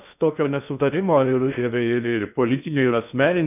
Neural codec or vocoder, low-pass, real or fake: codec, 16 kHz in and 24 kHz out, 0.6 kbps, FocalCodec, streaming, 2048 codes; 3.6 kHz; fake